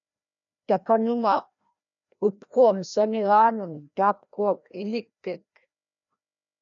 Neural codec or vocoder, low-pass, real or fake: codec, 16 kHz, 1 kbps, FreqCodec, larger model; 7.2 kHz; fake